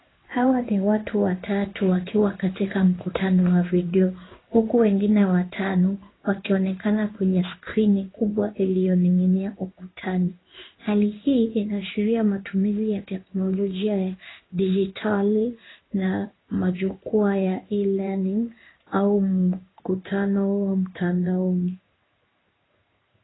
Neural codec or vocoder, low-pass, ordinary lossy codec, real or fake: codec, 16 kHz in and 24 kHz out, 1 kbps, XY-Tokenizer; 7.2 kHz; AAC, 16 kbps; fake